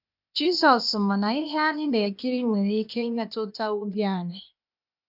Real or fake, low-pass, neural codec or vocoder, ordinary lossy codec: fake; 5.4 kHz; codec, 16 kHz, 0.8 kbps, ZipCodec; none